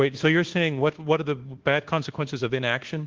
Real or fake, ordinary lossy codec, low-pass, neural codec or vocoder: fake; Opus, 16 kbps; 7.2 kHz; codec, 24 kHz, 1.2 kbps, DualCodec